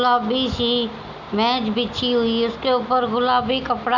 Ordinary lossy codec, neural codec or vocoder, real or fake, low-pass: none; none; real; 7.2 kHz